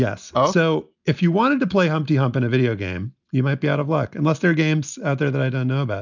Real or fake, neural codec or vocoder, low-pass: real; none; 7.2 kHz